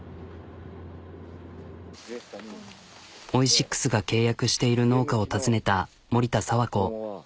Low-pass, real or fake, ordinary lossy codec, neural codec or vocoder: none; real; none; none